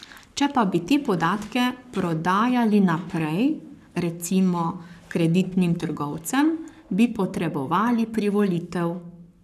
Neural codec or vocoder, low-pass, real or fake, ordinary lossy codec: codec, 44.1 kHz, 7.8 kbps, Pupu-Codec; 14.4 kHz; fake; none